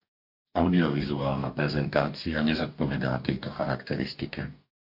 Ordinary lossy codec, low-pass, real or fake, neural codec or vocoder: MP3, 48 kbps; 5.4 kHz; fake; codec, 44.1 kHz, 2.6 kbps, DAC